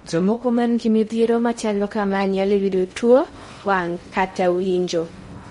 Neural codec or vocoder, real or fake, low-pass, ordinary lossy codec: codec, 16 kHz in and 24 kHz out, 0.6 kbps, FocalCodec, streaming, 2048 codes; fake; 10.8 kHz; MP3, 48 kbps